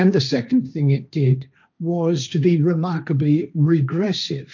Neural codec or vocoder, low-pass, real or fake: codec, 16 kHz, 1.1 kbps, Voila-Tokenizer; 7.2 kHz; fake